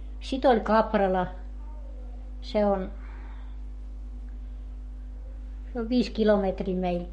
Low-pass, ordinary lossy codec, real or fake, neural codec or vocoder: 19.8 kHz; MP3, 48 kbps; fake; codec, 44.1 kHz, 7.8 kbps, Pupu-Codec